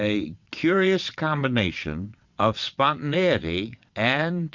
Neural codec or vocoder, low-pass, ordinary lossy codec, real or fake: vocoder, 22.05 kHz, 80 mel bands, WaveNeXt; 7.2 kHz; Opus, 64 kbps; fake